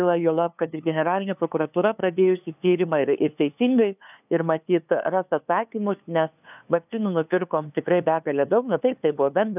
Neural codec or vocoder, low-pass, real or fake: codec, 16 kHz, 2 kbps, FunCodec, trained on LibriTTS, 25 frames a second; 3.6 kHz; fake